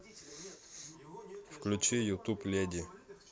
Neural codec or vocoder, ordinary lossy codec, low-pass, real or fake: none; none; none; real